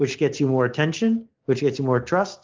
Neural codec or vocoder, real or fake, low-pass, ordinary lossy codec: none; real; 7.2 kHz; Opus, 32 kbps